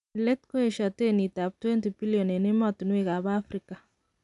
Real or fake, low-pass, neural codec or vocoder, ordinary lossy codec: real; 10.8 kHz; none; none